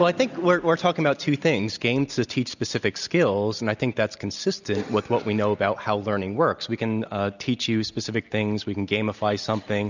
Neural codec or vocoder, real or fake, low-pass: none; real; 7.2 kHz